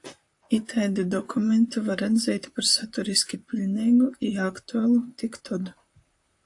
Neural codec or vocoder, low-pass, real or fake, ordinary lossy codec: vocoder, 44.1 kHz, 128 mel bands, Pupu-Vocoder; 10.8 kHz; fake; AAC, 64 kbps